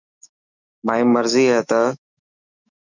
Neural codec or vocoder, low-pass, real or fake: autoencoder, 48 kHz, 128 numbers a frame, DAC-VAE, trained on Japanese speech; 7.2 kHz; fake